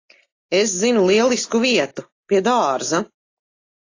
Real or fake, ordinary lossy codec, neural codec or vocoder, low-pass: real; AAC, 32 kbps; none; 7.2 kHz